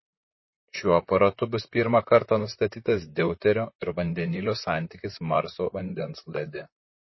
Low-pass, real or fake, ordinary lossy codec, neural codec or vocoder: 7.2 kHz; fake; MP3, 24 kbps; vocoder, 44.1 kHz, 128 mel bands, Pupu-Vocoder